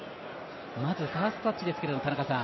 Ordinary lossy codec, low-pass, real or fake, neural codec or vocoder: MP3, 24 kbps; 7.2 kHz; real; none